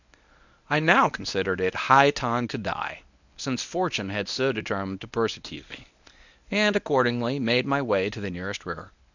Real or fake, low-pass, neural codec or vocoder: fake; 7.2 kHz; codec, 24 kHz, 0.9 kbps, WavTokenizer, medium speech release version 1